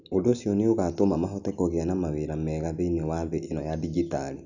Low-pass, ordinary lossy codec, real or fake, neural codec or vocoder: 7.2 kHz; Opus, 64 kbps; real; none